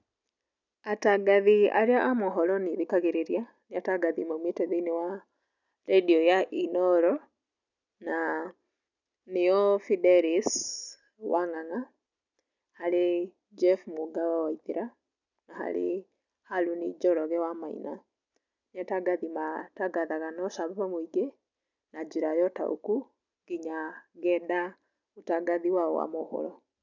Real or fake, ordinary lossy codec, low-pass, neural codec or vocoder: real; none; 7.2 kHz; none